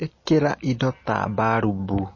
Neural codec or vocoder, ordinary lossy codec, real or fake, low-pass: none; MP3, 32 kbps; real; 7.2 kHz